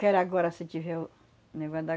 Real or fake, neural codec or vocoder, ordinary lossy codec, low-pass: real; none; none; none